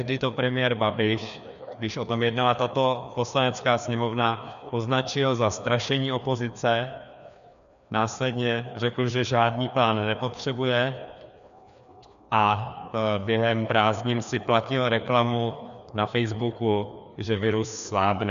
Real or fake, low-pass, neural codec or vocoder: fake; 7.2 kHz; codec, 16 kHz, 2 kbps, FreqCodec, larger model